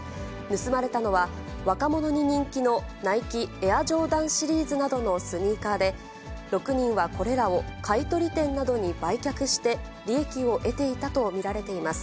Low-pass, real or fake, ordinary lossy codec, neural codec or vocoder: none; real; none; none